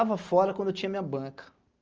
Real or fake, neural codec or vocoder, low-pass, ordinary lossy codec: real; none; 7.2 kHz; Opus, 24 kbps